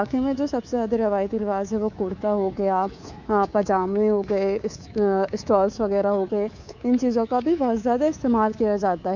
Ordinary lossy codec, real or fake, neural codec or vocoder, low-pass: none; fake; codec, 24 kHz, 3.1 kbps, DualCodec; 7.2 kHz